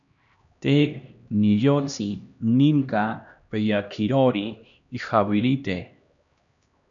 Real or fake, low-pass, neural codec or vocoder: fake; 7.2 kHz; codec, 16 kHz, 1 kbps, X-Codec, HuBERT features, trained on LibriSpeech